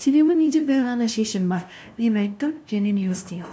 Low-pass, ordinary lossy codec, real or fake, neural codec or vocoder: none; none; fake; codec, 16 kHz, 0.5 kbps, FunCodec, trained on LibriTTS, 25 frames a second